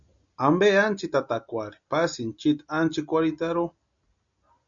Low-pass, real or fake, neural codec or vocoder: 7.2 kHz; real; none